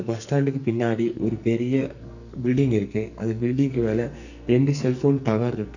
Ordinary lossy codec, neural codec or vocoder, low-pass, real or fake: none; codec, 44.1 kHz, 2.6 kbps, SNAC; 7.2 kHz; fake